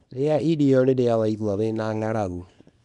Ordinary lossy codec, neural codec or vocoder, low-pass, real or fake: none; codec, 24 kHz, 0.9 kbps, WavTokenizer, small release; 10.8 kHz; fake